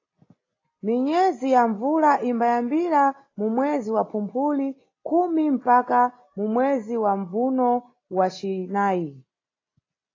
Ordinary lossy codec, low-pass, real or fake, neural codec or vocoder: AAC, 32 kbps; 7.2 kHz; real; none